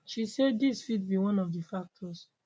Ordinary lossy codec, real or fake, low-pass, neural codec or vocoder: none; real; none; none